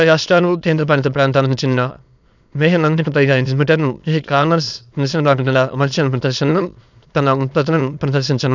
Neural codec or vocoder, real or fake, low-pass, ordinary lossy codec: autoencoder, 22.05 kHz, a latent of 192 numbers a frame, VITS, trained on many speakers; fake; 7.2 kHz; none